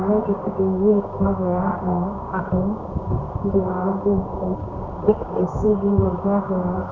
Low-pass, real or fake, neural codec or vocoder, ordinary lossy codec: 7.2 kHz; fake; codec, 24 kHz, 0.9 kbps, WavTokenizer, medium music audio release; none